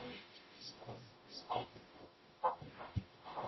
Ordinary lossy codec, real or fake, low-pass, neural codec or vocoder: MP3, 24 kbps; fake; 7.2 kHz; codec, 44.1 kHz, 0.9 kbps, DAC